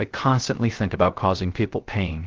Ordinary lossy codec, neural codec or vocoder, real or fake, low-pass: Opus, 16 kbps; codec, 16 kHz, 0.3 kbps, FocalCodec; fake; 7.2 kHz